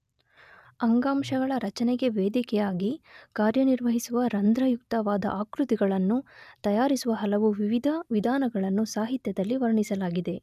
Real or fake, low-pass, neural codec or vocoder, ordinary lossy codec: real; 14.4 kHz; none; none